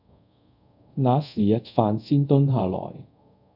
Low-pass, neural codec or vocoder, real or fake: 5.4 kHz; codec, 24 kHz, 0.5 kbps, DualCodec; fake